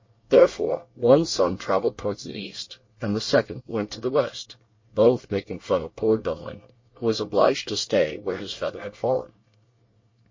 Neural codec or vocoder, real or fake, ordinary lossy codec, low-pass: codec, 24 kHz, 1 kbps, SNAC; fake; MP3, 32 kbps; 7.2 kHz